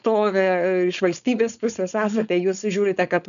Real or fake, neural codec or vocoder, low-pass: fake; codec, 16 kHz, 4.8 kbps, FACodec; 7.2 kHz